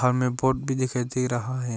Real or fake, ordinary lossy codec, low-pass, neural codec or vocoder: real; none; none; none